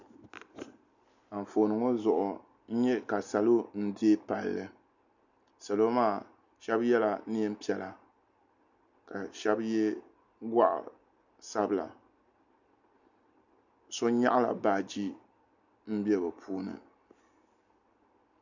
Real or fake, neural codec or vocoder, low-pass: real; none; 7.2 kHz